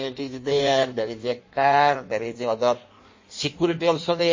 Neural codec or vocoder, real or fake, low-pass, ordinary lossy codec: codec, 16 kHz in and 24 kHz out, 1.1 kbps, FireRedTTS-2 codec; fake; 7.2 kHz; MP3, 32 kbps